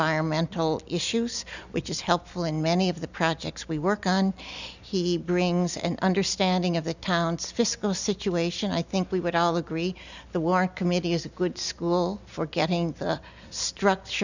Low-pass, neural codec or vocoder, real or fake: 7.2 kHz; none; real